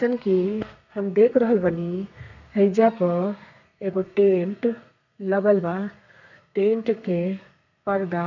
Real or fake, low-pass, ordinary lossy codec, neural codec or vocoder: fake; 7.2 kHz; none; codec, 32 kHz, 1.9 kbps, SNAC